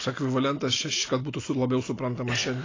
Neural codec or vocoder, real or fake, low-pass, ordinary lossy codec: none; real; 7.2 kHz; AAC, 32 kbps